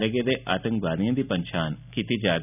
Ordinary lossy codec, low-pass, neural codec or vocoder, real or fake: none; 3.6 kHz; none; real